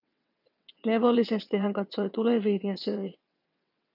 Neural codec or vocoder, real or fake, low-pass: vocoder, 22.05 kHz, 80 mel bands, WaveNeXt; fake; 5.4 kHz